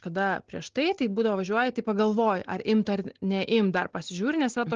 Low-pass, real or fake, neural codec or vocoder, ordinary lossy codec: 7.2 kHz; real; none; Opus, 16 kbps